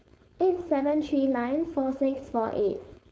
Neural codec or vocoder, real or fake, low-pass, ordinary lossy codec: codec, 16 kHz, 4.8 kbps, FACodec; fake; none; none